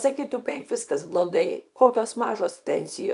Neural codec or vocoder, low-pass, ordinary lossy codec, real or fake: codec, 24 kHz, 0.9 kbps, WavTokenizer, small release; 10.8 kHz; AAC, 96 kbps; fake